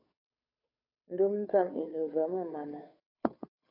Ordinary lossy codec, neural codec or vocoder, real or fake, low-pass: AAC, 32 kbps; codec, 16 kHz, 2 kbps, FunCodec, trained on Chinese and English, 25 frames a second; fake; 5.4 kHz